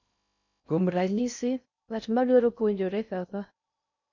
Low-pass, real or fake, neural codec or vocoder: 7.2 kHz; fake; codec, 16 kHz in and 24 kHz out, 0.6 kbps, FocalCodec, streaming, 2048 codes